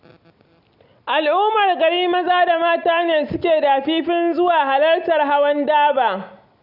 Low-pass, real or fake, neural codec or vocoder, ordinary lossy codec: 5.4 kHz; real; none; none